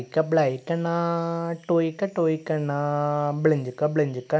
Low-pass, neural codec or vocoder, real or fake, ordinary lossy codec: none; none; real; none